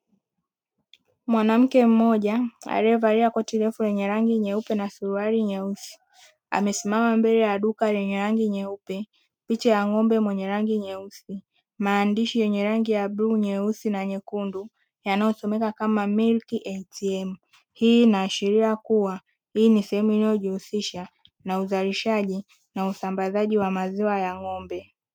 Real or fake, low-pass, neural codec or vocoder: real; 19.8 kHz; none